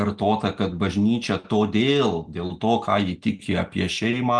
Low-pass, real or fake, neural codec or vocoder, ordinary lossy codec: 9.9 kHz; real; none; Opus, 24 kbps